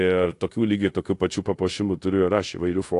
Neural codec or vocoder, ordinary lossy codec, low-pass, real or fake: codec, 24 kHz, 0.5 kbps, DualCodec; AAC, 48 kbps; 10.8 kHz; fake